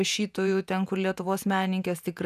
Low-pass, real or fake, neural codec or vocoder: 14.4 kHz; fake; vocoder, 48 kHz, 128 mel bands, Vocos